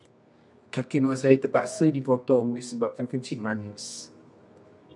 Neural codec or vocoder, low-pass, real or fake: codec, 24 kHz, 0.9 kbps, WavTokenizer, medium music audio release; 10.8 kHz; fake